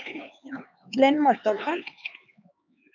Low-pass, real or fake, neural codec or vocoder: 7.2 kHz; fake; codec, 16 kHz, 4 kbps, X-Codec, HuBERT features, trained on LibriSpeech